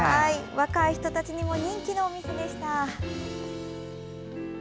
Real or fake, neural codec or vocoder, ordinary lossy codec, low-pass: real; none; none; none